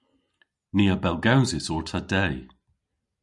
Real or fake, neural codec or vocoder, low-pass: real; none; 10.8 kHz